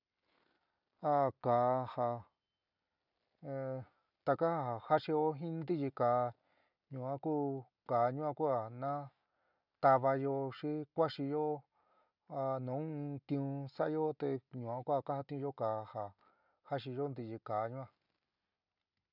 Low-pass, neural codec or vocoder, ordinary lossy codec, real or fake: 5.4 kHz; none; none; real